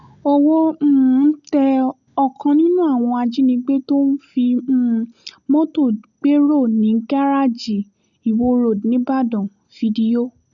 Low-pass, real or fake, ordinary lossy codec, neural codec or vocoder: 7.2 kHz; real; none; none